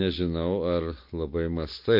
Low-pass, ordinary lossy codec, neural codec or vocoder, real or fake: 5.4 kHz; MP3, 32 kbps; none; real